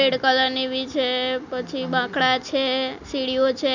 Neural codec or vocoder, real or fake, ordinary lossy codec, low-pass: none; real; none; 7.2 kHz